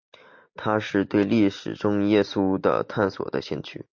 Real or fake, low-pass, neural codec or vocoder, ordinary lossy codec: real; 7.2 kHz; none; MP3, 48 kbps